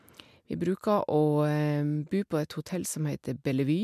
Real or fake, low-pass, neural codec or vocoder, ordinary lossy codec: real; 14.4 kHz; none; MP3, 96 kbps